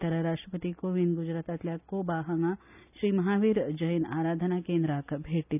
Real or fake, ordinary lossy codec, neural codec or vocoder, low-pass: real; none; none; 3.6 kHz